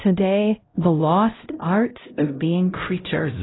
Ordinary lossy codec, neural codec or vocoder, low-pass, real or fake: AAC, 16 kbps; codec, 16 kHz, 0.5 kbps, X-Codec, HuBERT features, trained on LibriSpeech; 7.2 kHz; fake